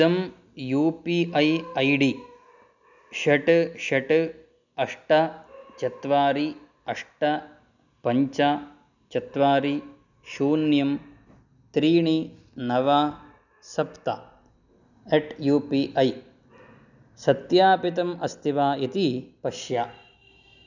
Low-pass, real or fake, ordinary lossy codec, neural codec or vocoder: 7.2 kHz; real; none; none